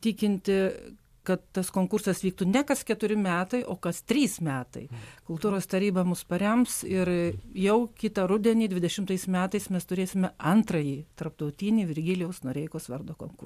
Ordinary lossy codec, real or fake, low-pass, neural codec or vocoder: MP3, 96 kbps; real; 14.4 kHz; none